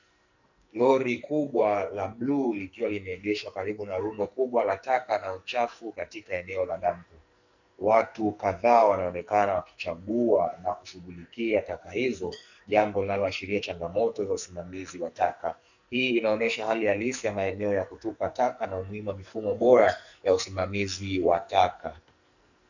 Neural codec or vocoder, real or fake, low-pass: codec, 44.1 kHz, 2.6 kbps, SNAC; fake; 7.2 kHz